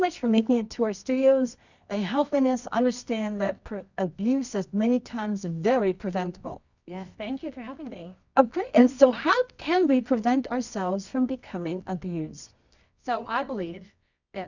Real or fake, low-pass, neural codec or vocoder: fake; 7.2 kHz; codec, 24 kHz, 0.9 kbps, WavTokenizer, medium music audio release